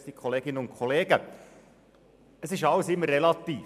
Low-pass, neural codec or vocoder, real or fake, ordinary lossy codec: 14.4 kHz; none; real; none